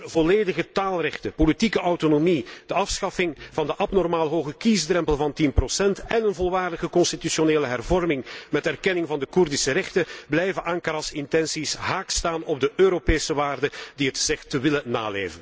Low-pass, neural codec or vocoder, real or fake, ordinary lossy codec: none; none; real; none